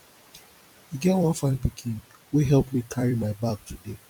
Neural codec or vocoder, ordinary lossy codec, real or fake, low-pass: vocoder, 44.1 kHz, 128 mel bands every 256 samples, BigVGAN v2; none; fake; 19.8 kHz